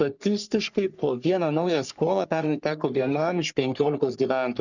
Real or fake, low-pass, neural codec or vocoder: fake; 7.2 kHz; codec, 44.1 kHz, 3.4 kbps, Pupu-Codec